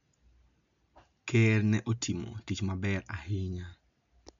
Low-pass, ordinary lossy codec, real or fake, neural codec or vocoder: 7.2 kHz; none; real; none